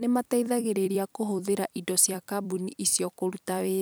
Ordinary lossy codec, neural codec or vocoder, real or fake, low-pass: none; vocoder, 44.1 kHz, 128 mel bands every 256 samples, BigVGAN v2; fake; none